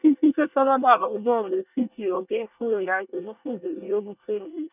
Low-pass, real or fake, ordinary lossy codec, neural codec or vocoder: 3.6 kHz; fake; none; codec, 24 kHz, 1 kbps, SNAC